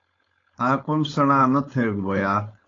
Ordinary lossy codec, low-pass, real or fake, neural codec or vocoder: AAC, 32 kbps; 7.2 kHz; fake; codec, 16 kHz, 4.8 kbps, FACodec